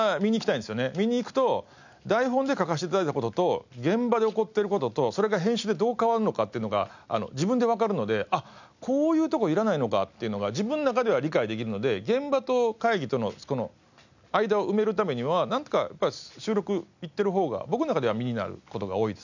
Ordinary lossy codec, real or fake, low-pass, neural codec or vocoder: none; real; 7.2 kHz; none